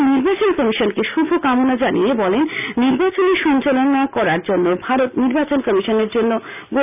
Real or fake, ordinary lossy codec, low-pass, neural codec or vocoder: real; none; 3.6 kHz; none